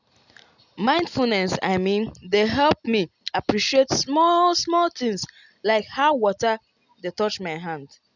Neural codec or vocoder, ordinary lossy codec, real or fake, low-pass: none; none; real; 7.2 kHz